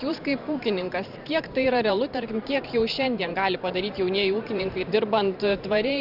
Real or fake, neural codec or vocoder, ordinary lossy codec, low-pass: fake; vocoder, 44.1 kHz, 128 mel bands every 512 samples, BigVGAN v2; Opus, 64 kbps; 5.4 kHz